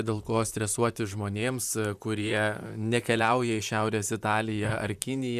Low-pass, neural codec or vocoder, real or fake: 14.4 kHz; vocoder, 44.1 kHz, 128 mel bands, Pupu-Vocoder; fake